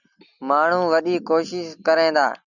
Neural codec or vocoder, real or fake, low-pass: none; real; 7.2 kHz